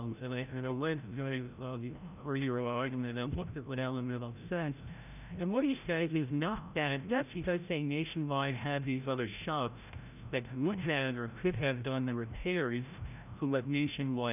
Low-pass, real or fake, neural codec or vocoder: 3.6 kHz; fake; codec, 16 kHz, 0.5 kbps, FreqCodec, larger model